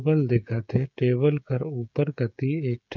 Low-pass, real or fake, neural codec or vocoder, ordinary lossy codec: 7.2 kHz; fake; codec, 16 kHz, 16 kbps, FreqCodec, smaller model; none